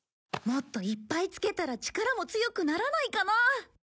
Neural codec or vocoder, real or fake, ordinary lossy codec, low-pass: none; real; none; none